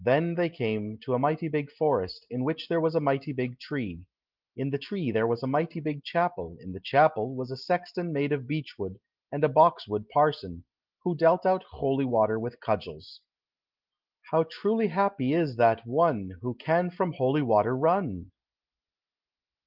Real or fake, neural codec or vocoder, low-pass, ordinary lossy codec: real; none; 5.4 kHz; Opus, 32 kbps